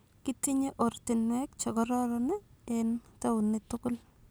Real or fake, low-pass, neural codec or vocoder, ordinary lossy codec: real; none; none; none